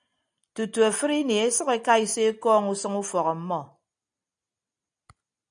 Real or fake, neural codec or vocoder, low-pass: real; none; 9.9 kHz